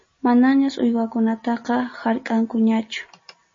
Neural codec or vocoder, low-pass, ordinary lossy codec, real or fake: none; 7.2 kHz; MP3, 32 kbps; real